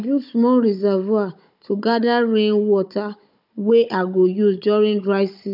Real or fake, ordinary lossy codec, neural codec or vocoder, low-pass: fake; none; codec, 16 kHz, 16 kbps, FunCodec, trained on Chinese and English, 50 frames a second; 5.4 kHz